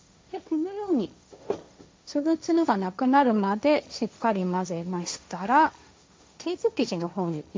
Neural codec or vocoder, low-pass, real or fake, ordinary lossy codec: codec, 16 kHz, 1.1 kbps, Voila-Tokenizer; none; fake; none